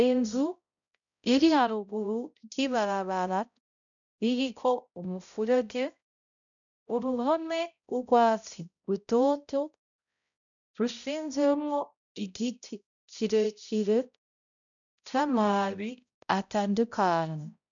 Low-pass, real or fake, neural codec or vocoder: 7.2 kHz; fake; codec, 16 kHz, 0.5 kbps, X-Codec, HuBERT features, trained on balanced general audio